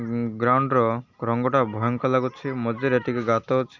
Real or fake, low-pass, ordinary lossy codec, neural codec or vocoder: real; 7.2 kHz; none; none